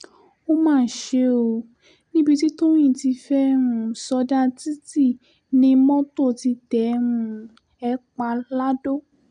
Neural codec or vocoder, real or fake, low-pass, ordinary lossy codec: none; real; 9.9 kHz; none